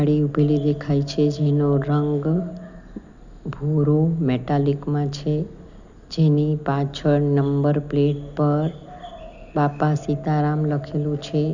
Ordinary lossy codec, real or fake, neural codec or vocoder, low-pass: none; real; none; 7.2 kHz